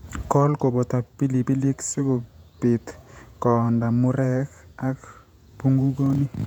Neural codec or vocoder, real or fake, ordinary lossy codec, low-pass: vocoder, 48 kHz, 128 mel bands, Vocos; fake; none; 19.8 kHz